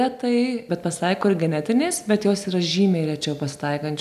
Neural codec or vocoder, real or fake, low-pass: none; real; 14.4 kHz